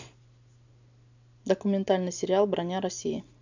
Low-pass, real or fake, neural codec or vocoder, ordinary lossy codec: 7.2 kHz; real; none; none